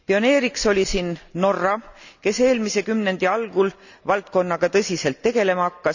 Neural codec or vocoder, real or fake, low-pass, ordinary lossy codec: none; real; 7.2 kHz; none